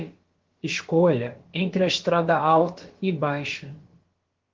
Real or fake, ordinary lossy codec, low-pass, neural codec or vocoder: fake; Opus, 16 kbps; 7.2 kHz; codec, 16 kHz, about 1 kbps, DyCAST, with the encoder's durations